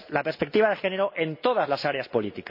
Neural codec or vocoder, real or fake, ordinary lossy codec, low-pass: none; real; MP3, 32 kbps; 5.4 kHz